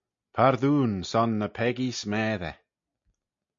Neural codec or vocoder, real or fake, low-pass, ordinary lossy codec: none; real; 7.2 kHz; MP3, 48 kbps